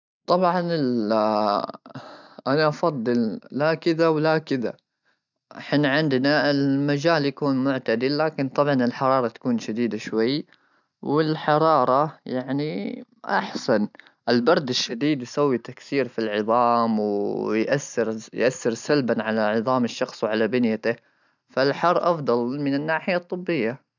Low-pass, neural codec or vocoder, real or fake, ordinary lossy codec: 7.2 kHz; none; real; none